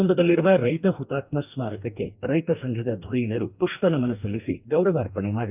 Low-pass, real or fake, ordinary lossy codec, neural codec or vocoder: 3.6 kHz; fake; none; codec, 44.1 kHz, 2.6 kbps, DAC